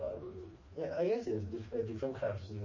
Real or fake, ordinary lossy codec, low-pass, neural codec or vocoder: fake; MP3, 32 kbps; 7.2 kHz; codec, 16 kHz, 2 kbps, FreqCodec, smaller model